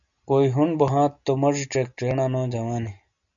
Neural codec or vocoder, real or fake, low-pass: none; real; 7.2 kHz